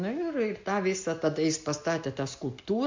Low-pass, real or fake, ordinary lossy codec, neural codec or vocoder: 7.2 kHz; real; MP3, 64 kbps; none